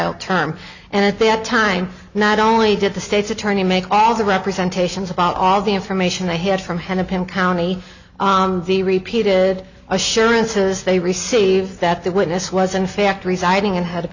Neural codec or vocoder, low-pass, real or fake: none; 7.2 kHz; real